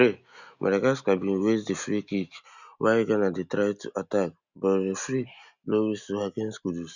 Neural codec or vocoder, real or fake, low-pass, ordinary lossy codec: none; real; 7.2 kHz; none